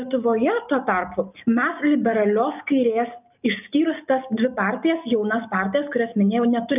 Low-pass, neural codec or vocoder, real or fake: 3.6 kHz; none; real